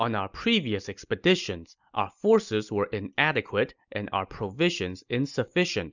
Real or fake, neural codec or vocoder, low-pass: real; none; 7.2 kHz